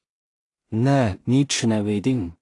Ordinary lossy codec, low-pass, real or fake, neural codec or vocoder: AAC, 48 kbps; 10.8 kHz; fake; codec, 16 kHz in and 24 kHz out, 0.4 kbps, LongCat-Audio-Codec, two codebook decoder